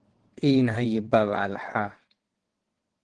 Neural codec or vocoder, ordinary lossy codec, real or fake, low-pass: vocoder, 22.05 kHz, 80 mel bands, WaveNeXt; Opus, 16 kbps; fake; 9.9 kHz